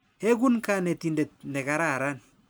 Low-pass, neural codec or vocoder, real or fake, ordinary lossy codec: none; none; real; none